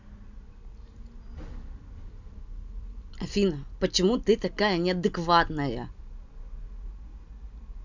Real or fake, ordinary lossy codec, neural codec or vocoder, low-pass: real; none; none; 7.2 kHz